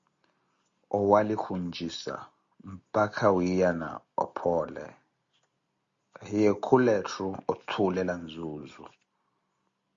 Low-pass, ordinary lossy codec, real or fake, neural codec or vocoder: 7.2 kHz; Opus, 64 kbps; real; none